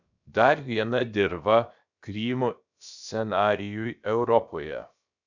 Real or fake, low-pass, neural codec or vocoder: fake; 7.2 kHz; codec, 16 kHz, about 1 kbps, DyCAST, with the encoder's durations